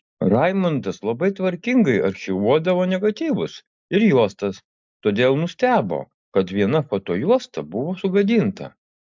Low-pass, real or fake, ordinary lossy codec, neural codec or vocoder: 7.2 kHz; real; MP3, 64 kbps; none